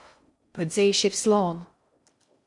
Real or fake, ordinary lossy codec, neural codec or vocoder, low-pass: fake; MP3, 64 kbps; codec, 16 kHz in and 24 kHz out, 0.6 kbps, FocalCodec, streaming, 4096 codes; 10.8 kHz